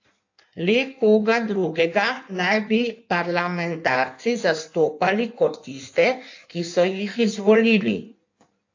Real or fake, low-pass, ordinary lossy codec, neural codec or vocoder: fake; 7.2 kHz; AAC, 48 kbps; codec, 16 kHz in and 24 kHz out, 1.1 kbps, FireRedTTS-2 codec